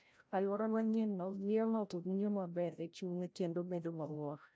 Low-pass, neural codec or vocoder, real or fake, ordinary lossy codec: none; codec, 16 kHz, 0.5 kbps, FreqCodec, larger model; fake; none